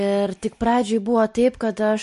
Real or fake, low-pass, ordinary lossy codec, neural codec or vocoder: real; 14.4 kHz; MP3, 48 kbps; none